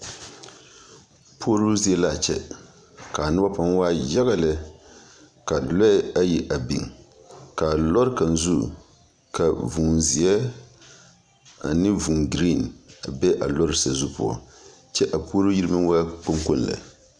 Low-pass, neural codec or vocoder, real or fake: 9.9 kHz; none; real